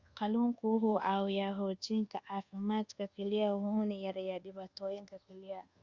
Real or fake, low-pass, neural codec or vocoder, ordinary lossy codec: fake; 7.2 kHz; codec, 24 kHz, 1.2 kbps, DualCodec; Opus, 64 kbps